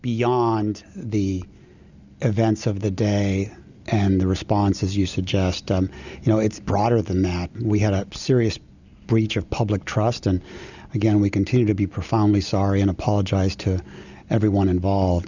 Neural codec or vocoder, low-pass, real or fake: none; 7.2 kHz; real